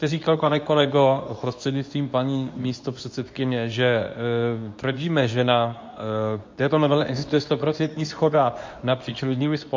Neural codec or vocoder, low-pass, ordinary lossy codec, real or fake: codec, 24 kHz, 0.9 kbps, WavTokenizer, medium speech release version 2; 7.2 kHz; MP3, 48 kbps; fake